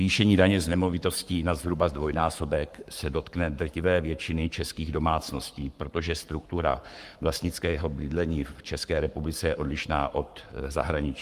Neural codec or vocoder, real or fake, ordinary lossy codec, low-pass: codec, 44.1 kHz, 7.8 kbps, Pupu-Codec; fake; Opus, 32 kbps; 14.4 kHz